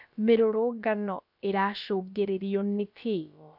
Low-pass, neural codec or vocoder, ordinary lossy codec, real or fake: 5.4 kHz; codec, 16 kHz, about 1 kbps, DyCAST, with the encoder's durations; none; fake